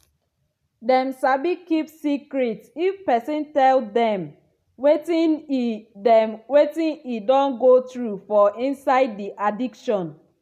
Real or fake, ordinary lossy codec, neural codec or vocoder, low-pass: real; none; none; 14.4 kHz